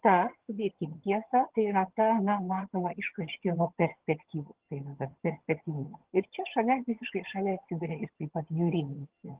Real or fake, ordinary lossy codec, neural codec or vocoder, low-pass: fake; Opus, 16 kbps; vocoder, 22.05 kHz, 80 mel bands, HiFi-GAN; 3.6 kHz